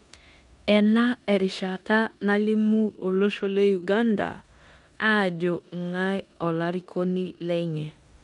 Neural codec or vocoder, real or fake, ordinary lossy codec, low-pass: codec, 16 kHz in and 24 kHz out, 0.9 kbps, LongCat-Audio-Codec, four codebook decoder; fake; none; 10.8 kHz